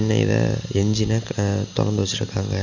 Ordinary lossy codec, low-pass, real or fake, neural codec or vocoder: none; 7.2 kHz; real; none